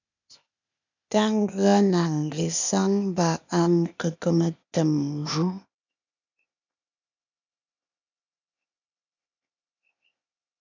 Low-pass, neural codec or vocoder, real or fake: 7.2 kHz; codec, 16 kHz, 0.8 kbps, ZipCodec; fake